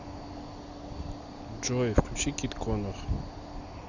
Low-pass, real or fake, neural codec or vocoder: 7.2 kHz; real; none